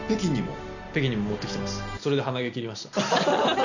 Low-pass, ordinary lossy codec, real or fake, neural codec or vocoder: 7.2 kHz; none; real; none